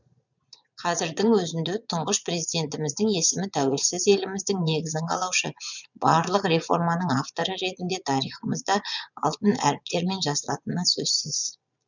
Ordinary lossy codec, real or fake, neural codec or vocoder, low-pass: none; fake; vocoder, 22.05 kHz, 80 mel bands, Vocos; 7.2 kHz